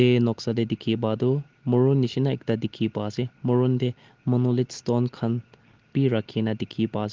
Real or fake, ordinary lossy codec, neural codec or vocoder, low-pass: real; Opus, 16 kbps; none; 7.2 kHz